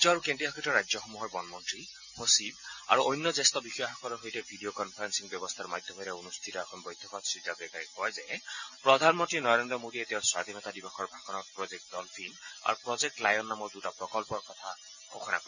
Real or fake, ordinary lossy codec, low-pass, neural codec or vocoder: real; none; 7.2 kHz; none